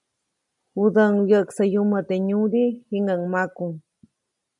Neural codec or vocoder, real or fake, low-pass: none; real; 10.8 kHz